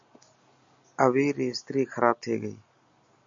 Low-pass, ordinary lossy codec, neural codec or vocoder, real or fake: 7.2 kHz; MP3, 48 kbps; none; real